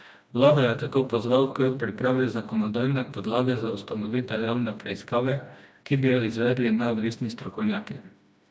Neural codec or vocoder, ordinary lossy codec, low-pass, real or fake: codec, 16 kHz, 1 kbps, FreqCodec, smaller model; none; none; fake